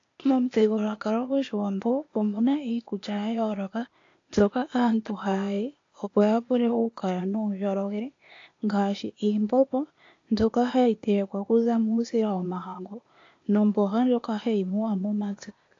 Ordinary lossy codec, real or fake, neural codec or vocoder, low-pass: AAC, 48 kbps; fake; codec, 16 kHz, 0.8 kbps, ZipCodec; 7.2 kHz